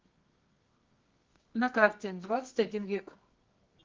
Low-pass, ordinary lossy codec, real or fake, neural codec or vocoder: 7.2 kHz; Opus, 32 kbps; fake; codec, 24 kHz, 0.9 kbps, WavTokenizer, medium music audio release